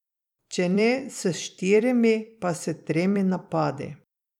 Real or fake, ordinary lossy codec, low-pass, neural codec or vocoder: fake; none; 19.8 kHz; vocoder, 44.1 kHz, 128 mel bands every 256 samples, BigVGAN v2